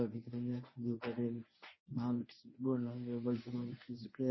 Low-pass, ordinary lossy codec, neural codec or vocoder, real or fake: 7.2 kHz; MP3, 24 kbps; codec, 24 kHz, 0.9 kbps, WavTokenizer, medium speech release version 1; fake